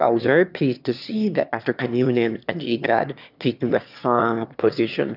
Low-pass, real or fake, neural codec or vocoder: 5.4 kHz; fake; autoencoder, 22.05 kHz, a latent of 192 numbers a frame, VITS, trained on one speaker